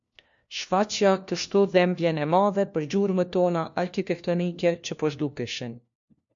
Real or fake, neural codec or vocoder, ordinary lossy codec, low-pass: fake; codec, 16 kHz, 1 kbps, FunCodec, trained on LibriTTS, 50 frames a second; MP3, 48 kbps; 7.2 kHz